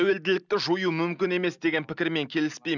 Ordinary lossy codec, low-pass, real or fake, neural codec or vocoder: none; 7.2 kHz; real; none